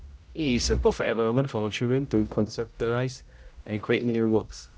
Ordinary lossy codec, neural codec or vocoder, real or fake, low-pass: none; codec, 16 kHz, 0.5 kbps, X-Codec, HuBERT features, trained on general audio; fake; none